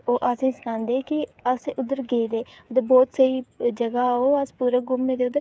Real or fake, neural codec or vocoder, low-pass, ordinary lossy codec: fake; codec, 16 kHz, 8 kbps, FreqCodec, smaller model; none; none